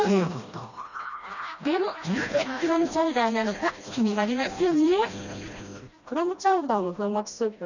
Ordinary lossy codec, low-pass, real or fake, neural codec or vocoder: none; 7.2 kHz; fake; codec, 16 kHz, 1 kbps, FreqCodec, smaller model